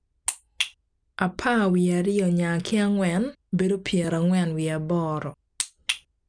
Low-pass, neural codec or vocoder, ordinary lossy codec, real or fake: 9.9 kHz; none; none; real